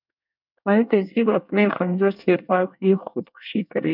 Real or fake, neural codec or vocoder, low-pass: fake; codec, 24 kHz, 1 kbps, SNAC; 5.4 kHz